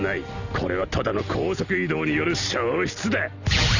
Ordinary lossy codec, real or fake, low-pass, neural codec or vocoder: none; real; 7.2 kHz; none